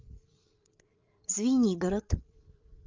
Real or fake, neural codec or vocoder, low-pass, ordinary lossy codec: fake; codec, 16 kHz, 8 kbps, FreqCodec, larger model; 7.2 kHz; Opus, 24 kbps